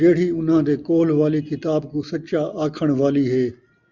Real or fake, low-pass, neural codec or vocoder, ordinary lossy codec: real; 7.2 kHz; none; Opus, 64 kbps